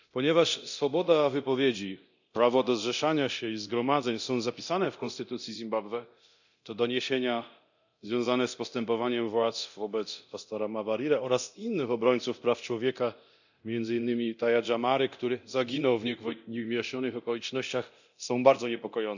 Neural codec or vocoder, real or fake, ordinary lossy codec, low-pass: codec, 24 kHz, 0.9 kbps, DualCodec; fake; none; 7.2 kHz